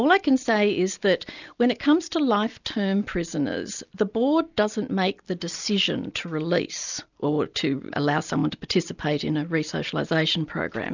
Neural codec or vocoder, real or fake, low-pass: none; real; 7.2 kHz